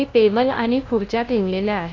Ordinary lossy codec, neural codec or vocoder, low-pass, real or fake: AAC, 32 kbps; codec, 16 kHz, 0.5 kbps, FunCodec, trained on LibriTTS, 25 frames a second; 7.2 kHz; fake